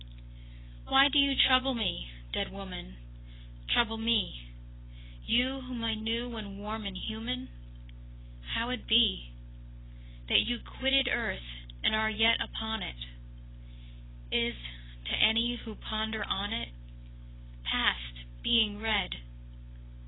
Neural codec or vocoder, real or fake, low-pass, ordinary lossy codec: none; real; 7.2 kHz; AAC, 16 kbps